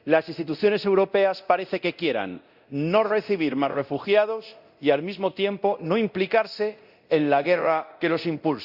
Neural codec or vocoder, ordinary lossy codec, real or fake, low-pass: codec, 24 kHz, 0.9 kbps, DualCodec; Opus, 64 kbps; fake; 5.4 kHz